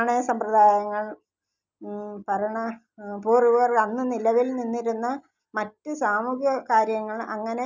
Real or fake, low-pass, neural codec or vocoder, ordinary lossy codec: real; 7.2 kHz; none; none